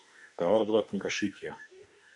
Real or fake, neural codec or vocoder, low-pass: fake; autoencoder, 48 kHz, 32 numbers a frame, DAC-VAE, trained on Japanese speech; 10.8 kHz